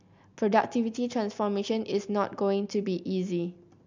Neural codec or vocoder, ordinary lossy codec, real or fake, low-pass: none; MP3, 64 kbps; real; 7.2 kHz